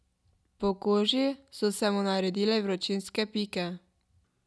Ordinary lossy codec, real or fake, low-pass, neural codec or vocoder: none; real; none; none